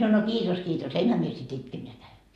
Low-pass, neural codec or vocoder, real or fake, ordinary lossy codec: 14.4 kHz; none; real; MP3, 64 kbps